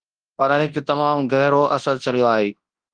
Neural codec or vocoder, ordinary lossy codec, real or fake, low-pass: codec, 24 kHz, 0.9 kbps, WavTokenizer, large speech release; Opus, 24 kbps; fake; 9.9 kHz